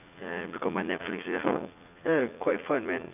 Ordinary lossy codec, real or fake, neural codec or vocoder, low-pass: none; fake; vocoder, 44.1 kHz, 80 mel bands, Vocos; 3.6 kHz